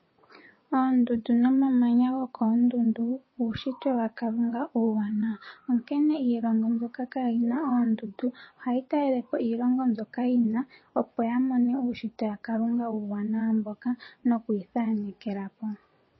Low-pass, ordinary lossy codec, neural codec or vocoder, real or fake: 7.2 kHz; MP3, 24 kbps; vocoder, 22.05 kHz, 80 mel bands, WaveNeXt; fake